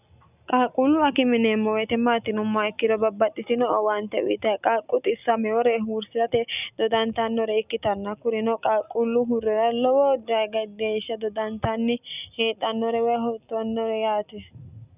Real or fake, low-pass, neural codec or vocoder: real; 3.6 kHz; none